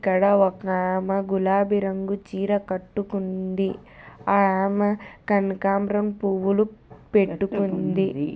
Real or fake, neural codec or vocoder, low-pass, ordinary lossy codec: real; none; none; none